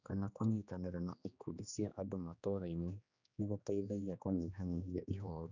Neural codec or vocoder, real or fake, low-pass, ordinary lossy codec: codec, 16 kHz, 2 kbps, X-Codec, HuBERT features, trained on general audio; fake; 7.2 kHz; none